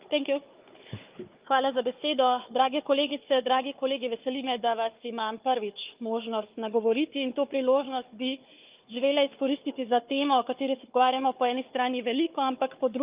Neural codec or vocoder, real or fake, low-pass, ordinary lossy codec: codec, 16 kHz, 4 kbps, FunCodec, trained on Chinese and English, 50 frames a second; fake; 3.6 kHz; Opus, 24 kbps